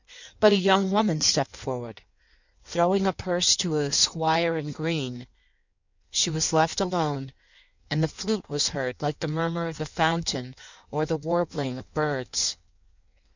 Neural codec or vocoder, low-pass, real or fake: codec, 16 kHz in and 24 kHz out, 1.1 kbps, FireRedTTS-2 codec; 7.2 kHz; fake